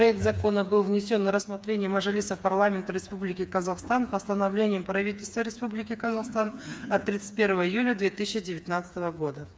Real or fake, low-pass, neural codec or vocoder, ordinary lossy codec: fake; none; codec, 16 kHz, 4 kbps, FreqCodec, smaller model; none